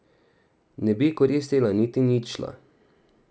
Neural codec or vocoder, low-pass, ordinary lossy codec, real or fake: none; none; none; real